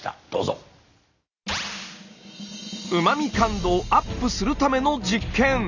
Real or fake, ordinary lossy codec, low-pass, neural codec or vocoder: real; none; 7.2 kHz; none